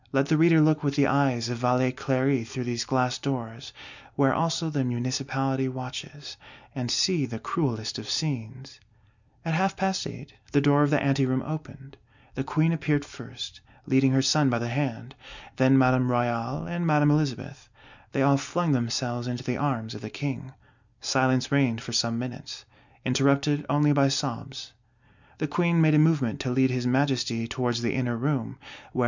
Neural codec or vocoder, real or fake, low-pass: none; real; 7.2 kHz